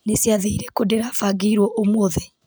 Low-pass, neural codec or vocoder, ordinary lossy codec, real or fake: none; none; none; real